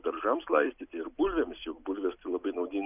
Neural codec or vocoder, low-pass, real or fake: none; 3.6 kHz; real